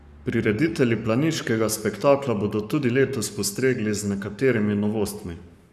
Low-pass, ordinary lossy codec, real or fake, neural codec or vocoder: 14.4 kHz; none; fake; codec, 44.1 kHz, 7.8 kbps, Pupu-Codec